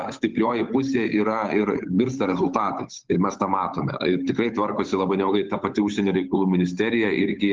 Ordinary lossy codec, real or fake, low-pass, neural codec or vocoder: Opus, 24 kbps; fake; 7.2 kHz; codec, 16 kHz, 8 kbps, FunCodec, trained on Chinese and English, 25 frames a second